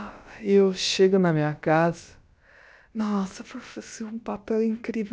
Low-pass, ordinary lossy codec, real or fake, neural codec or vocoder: none; none; fake; codec, 16 kHz, about 1 kbps, DyCAST, with the encoder's durations